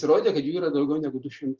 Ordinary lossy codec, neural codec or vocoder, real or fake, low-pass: Opus, 24 kbps; none; real; 7.2 kHz